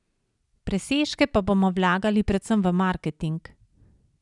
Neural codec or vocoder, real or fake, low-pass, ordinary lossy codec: none; real; 10.8 kHz; none